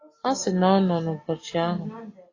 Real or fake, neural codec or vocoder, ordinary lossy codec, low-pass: real; none; AAC, 32 kbps; 7.2 kHz